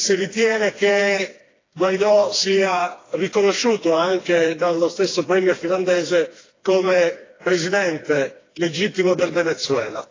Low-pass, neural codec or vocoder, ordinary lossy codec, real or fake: 7.2 kHz; codec, 16 kHz, 2 kbps, FreqCodec, smaller model; AAC, 32 kbps; fake